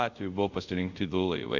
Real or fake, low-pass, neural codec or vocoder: fake; 7.2 kHz; codec, 24 kHz, 0.5 kbps, DualCodec